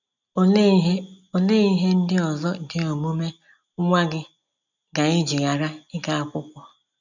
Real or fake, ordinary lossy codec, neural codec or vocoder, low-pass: real; none; none; 7.2 kHz